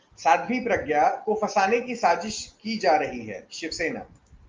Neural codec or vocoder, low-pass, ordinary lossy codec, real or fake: none; 7.2 kHz; Opus, 32 kbps; real